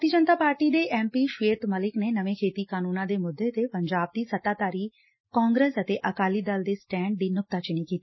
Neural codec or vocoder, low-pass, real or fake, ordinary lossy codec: none; 7.2 kHz; real; MP3, 24 kbps